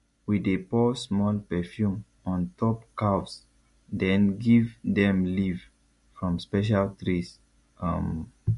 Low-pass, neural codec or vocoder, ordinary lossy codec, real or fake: 14.4 kHz; none; MP3, 48 kbps; real